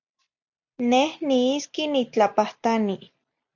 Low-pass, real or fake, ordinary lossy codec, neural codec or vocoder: 7.2 kHz; real; MP3, 64 kbps; none